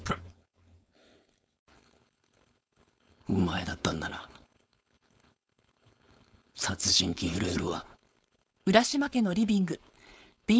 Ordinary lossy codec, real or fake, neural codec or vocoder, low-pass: none; fake; codec, 16 kHz, 4.8 kbps, FACodec; none